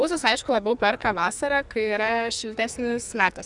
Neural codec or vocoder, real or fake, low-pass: codec, 44.1 kHz, 2.6 kbps, SNAC; fake; 10.8 kHz